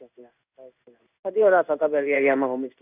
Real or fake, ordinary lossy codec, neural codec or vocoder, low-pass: fake; none; codec, 16 kHz in and 24 kHz out, 1 kbps, XY-Tokenizer; 3.6 kHz